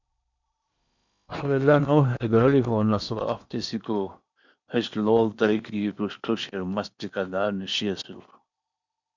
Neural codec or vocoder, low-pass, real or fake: codec, 16 kHz in and 24 kHz out, 0.8 kbps, FocalCodec, streaming, 65536 codes; 7.2 kHz; fake